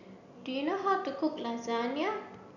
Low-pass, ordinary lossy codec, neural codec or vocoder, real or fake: 7.2 kHz; none; none; real